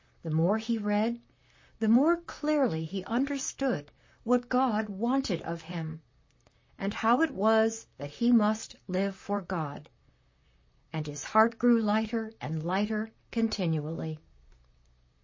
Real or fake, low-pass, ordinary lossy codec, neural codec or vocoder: fake; 7.2 kHz; MP3, 32 kbps; vocoder, 44.1 kHz, 128 mel bands, Pupu-Vocoder